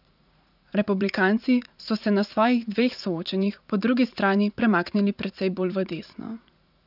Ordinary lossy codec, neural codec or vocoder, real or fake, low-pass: none; vocoder, 44.1 kHz, 80 mel bands, Vocos; fake; 5.4 kHz